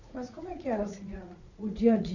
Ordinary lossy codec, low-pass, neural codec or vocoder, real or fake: none; 7.2 kHz; vocoder, 44.1 kHz, 80 mel bands, Vocos; fake